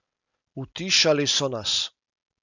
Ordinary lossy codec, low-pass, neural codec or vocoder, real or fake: none; 7.2 kHz; none; real